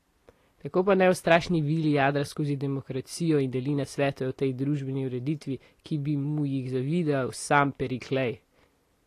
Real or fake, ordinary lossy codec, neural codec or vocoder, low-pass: real; AAC, 48 kbps; none; 14.4 kHz